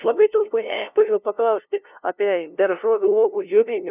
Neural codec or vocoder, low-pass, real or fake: codec, 16 kHz, 0.5 kbps, FunCodec, trained on LibriTTS, 25 frames a second; 3.6 kHz; fake